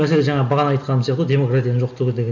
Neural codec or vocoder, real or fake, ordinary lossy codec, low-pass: none; real; none; 7.2 kHz